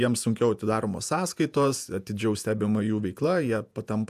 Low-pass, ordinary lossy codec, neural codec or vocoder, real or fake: 14.4 kHz; AAC, 96 kbps; vocoder, 48 kHz, 128 mel bands, Vocos; fake